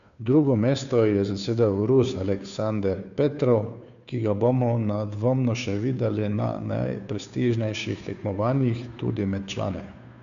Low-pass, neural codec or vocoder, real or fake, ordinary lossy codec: 7.2 kHz; codec, 16 kHz, 2 kbps, FunCodec, trained on Chinese and English, 25 frames a second; fake; none